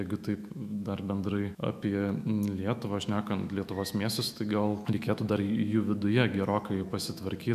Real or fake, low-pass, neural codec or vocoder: fake; 14.4 kHz; autoencoder, 48 kHz, 128 numbers a frame, DAC-VAE, trained on Japanese speech